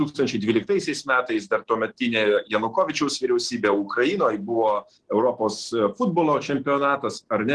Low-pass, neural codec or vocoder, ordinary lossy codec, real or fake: 10.8 kHz; none; Opus, 16 kbps; real